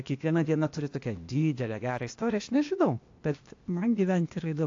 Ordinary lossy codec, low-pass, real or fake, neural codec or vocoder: AAC, 48 kbps; 7.2 kHz; fake; codec, 16 kHz, 0.8 kbps, ZipCodec